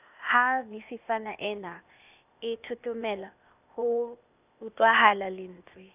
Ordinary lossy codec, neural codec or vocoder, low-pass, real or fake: none; codec, 16 kHz, 0.8 kbps, ZipCodec; 3.6 kHz; fake